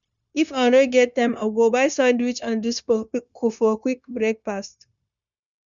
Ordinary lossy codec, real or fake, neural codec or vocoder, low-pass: none; fake; codec, 16 kHz, 0.9 kbps, LongCat-Audio-Codec; 7.2 kHz